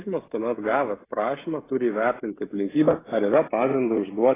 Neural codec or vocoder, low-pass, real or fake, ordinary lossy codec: codec, 16 kHz, 16 kbps, FreqCodec, smaller model; 3.6 kHz; fake; AAC, 16 kbps